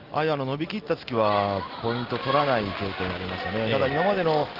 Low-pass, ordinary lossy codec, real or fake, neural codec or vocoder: 5.4 kHz; Opus, 16 kbps; real; none